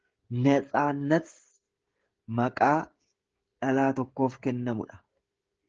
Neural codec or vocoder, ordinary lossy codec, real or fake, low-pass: codec, 16 kHz, 16 kbps, FreqCodec, smaller model; Opus, 16 kbps; fake; 7.2 kHz